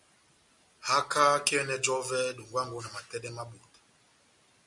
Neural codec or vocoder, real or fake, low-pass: none; real; 10.8 kHz